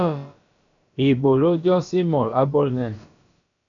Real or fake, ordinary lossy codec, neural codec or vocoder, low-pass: fake; MP3, 96 kbps; codec, 16 kHz, about 1 kbps, DyCAST, with the encoder's durations; 7.2 kHz